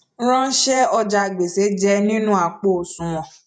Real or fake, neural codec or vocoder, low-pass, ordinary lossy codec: fake; vocoder, 48 kHz, 128 mel bands, Vocos; 9.9 kHz; none